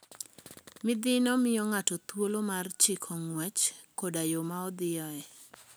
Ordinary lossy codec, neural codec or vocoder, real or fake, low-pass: none; none; real; none